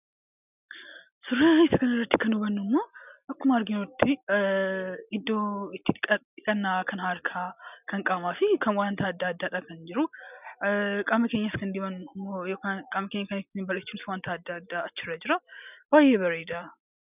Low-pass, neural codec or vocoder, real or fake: 3.6 kHz; none; real